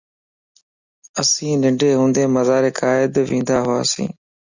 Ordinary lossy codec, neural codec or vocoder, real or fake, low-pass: Opus, 64 kbps; none; real; 7.2 kHz